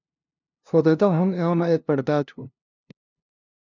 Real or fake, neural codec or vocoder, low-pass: fake; codec, 16 kHz, 0.5 kbps, FunCodec, trained on LibriTTS, 25 frames a second; 7.2 kHz